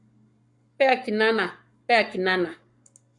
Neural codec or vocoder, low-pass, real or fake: codec, 44.1 kHz, 7.8 kbps, Pupu-Codec; 10.8 kHz; fake